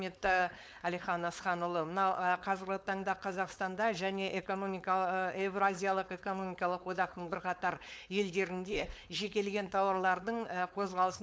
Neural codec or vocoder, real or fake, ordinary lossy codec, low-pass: codec, 16 kHz, 4.8 kbps, FACodec; fake; none; none